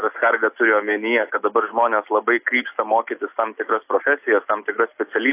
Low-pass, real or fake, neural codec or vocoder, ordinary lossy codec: 3.6 kHz; real; none; AAC, 32 kbps